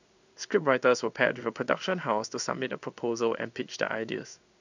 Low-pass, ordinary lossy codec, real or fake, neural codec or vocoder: 7.2 kHz; none; fake; codec, 16 kHz in and 24 kHz out, 1 kbps, XY-Tokenizer